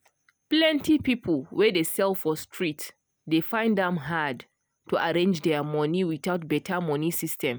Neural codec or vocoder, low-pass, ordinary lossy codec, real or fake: none; none; none; real